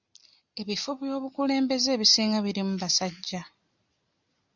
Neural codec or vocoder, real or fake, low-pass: none; real; 7.2 kHz